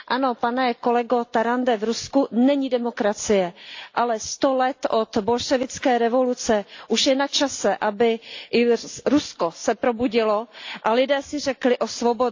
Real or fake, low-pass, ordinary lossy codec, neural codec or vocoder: real; 7.2 kHz; AAC, 48 kbps; none